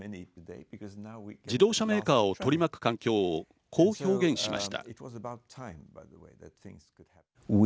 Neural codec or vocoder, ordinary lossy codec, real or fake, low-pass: none; none; real; none